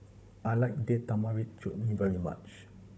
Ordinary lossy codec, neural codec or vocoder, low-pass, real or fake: none; codec, 16 kHz, 16 kbps, FunCodec, trained on Chinese and English, 50 frames a second; none; fake